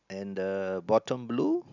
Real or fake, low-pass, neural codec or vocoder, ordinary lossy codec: real; 7.2 kHz; none; none